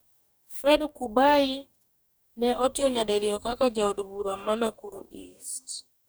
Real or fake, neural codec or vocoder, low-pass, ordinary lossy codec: fake; codec, 44.1 kHz, 2.6 kbps, DAC; none; none